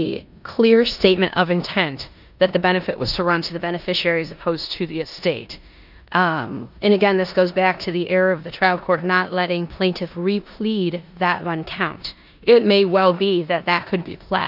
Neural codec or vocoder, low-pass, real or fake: codec, 16 kHz in and 24 kHz out, 0.9 kbps, LongCat-Audio-Codec, four codebook decoder; 5.4 kHz; fake